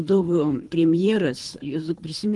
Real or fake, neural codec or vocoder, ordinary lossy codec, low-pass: fake; codec, 24 kHz, 3 kbps, HILCodec; Opus, 24 kbps; 10.8 kHz